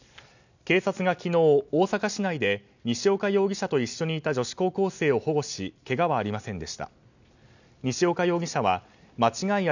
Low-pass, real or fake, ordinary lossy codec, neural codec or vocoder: 7.2 kHz; real; none; none